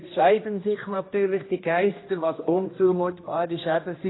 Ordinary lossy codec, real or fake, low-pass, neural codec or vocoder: AAC, 16 kbps; fake; 7.2 kHz; codec, 16 kHz, 1 kbps, X-Codec, HuBERT features, trained on general audio